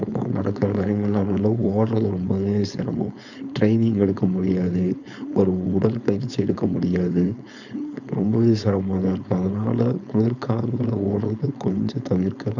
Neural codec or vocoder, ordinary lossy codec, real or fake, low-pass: codec, 16 kHz, 4.8 kbps, FACodec; none; fake; 7.2 kHz